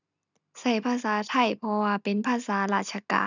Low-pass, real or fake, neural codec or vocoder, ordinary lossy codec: 7.2 kHz; real; none; none